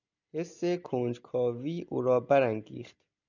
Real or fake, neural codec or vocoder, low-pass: real; none; 7.2 kHz